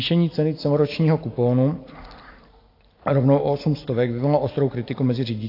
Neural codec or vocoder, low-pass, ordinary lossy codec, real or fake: none; 5.4 kHz; AAC, 32 kbps; real